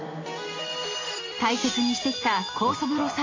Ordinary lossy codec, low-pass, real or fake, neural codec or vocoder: AAC, 32 kbps; 7.2 kHz; real; none